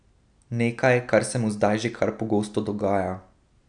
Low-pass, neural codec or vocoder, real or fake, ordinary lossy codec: 9.9 kHz; none; real; none